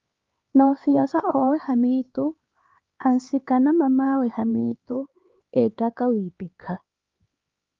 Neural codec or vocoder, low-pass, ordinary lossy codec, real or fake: codec, 16 kHz, 4 kbps, X-Codec, HuBERT features, trained on LibriSpeech; 7.2 kHz; Opus, 32 kbps; fake